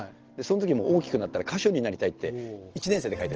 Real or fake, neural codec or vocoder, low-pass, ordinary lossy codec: real; none; 7.2 kHz; Opus, 16 kbps